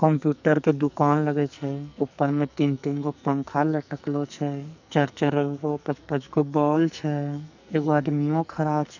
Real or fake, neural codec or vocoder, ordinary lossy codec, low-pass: fake; codec, 44.1 kHz, 2.6 kbps, SNAC; none; 7.2 kHz